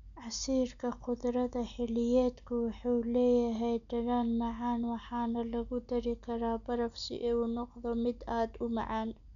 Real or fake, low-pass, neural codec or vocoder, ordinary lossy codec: real; 7.2 kHz; none; none